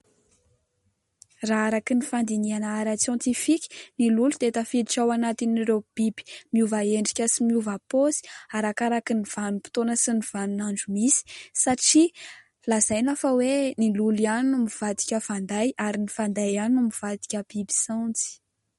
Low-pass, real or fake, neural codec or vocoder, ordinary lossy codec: 19.8 kHz; real; none; MP3, 48 kbps